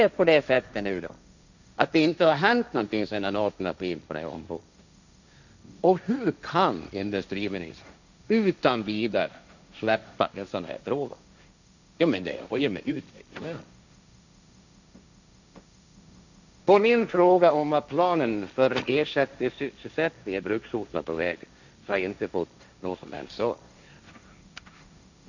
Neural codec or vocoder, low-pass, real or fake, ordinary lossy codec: codec, 16 kHz, 1.1 kbps, Voila-Tokenizer; 7.2 kHz; fake; none